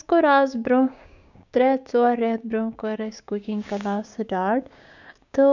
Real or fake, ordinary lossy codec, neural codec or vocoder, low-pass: fake; none; codec, 16 kHz, 6 kbps, DAC; 7.2 kHz